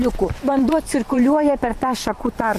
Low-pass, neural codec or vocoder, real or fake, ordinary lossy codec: 14.4 kHz; vocoder, 44.1 kHz, 128 mel bands every 512 samples, BigVGAN v2; fake; MP3, 64 kbps